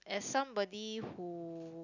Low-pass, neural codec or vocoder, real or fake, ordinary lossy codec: 7.2 kHz; none; real; none